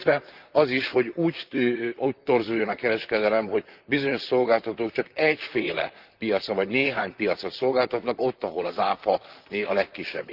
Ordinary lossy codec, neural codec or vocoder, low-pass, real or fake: Opus, 32 kbps; vocoder, 44.1 kHz, 128 mel bands, Pupu-Vocoder; 5.4 kHz; fake